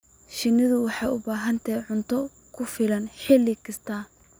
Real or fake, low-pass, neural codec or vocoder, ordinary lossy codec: real; none; none; none